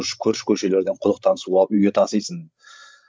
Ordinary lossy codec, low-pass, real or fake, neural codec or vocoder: none; none; fake; codec, 16 kHz, 16 kbps, FreqCodec, larger model